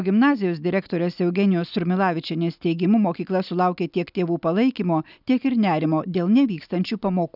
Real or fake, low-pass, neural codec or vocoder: real; 5.4 kHz; none